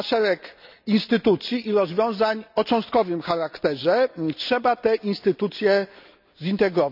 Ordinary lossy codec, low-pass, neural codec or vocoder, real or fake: none; 5.4 kHz; none; real